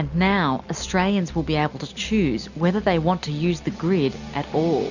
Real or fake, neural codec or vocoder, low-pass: real; none; 7.2 kHz